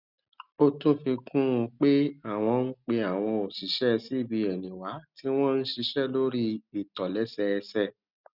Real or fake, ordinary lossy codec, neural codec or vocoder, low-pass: real; none; none; 5.4 kHz